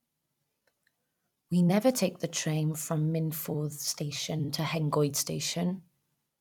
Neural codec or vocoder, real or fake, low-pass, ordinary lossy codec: vocoder, 44.1 kHz, 128 mel bands every 256 samples, BigVGAN v2; fake; 19.8 kHz; none